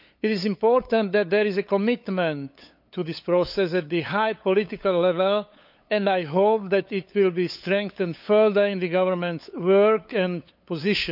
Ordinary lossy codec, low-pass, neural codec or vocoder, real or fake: none; 5.4 kHz; codec, 16 kHz, 8 kbps, FunCodec, trained on LibriTTS, 25 frames a second; fake